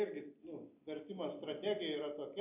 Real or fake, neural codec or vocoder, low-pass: real; none; 3.6 kHz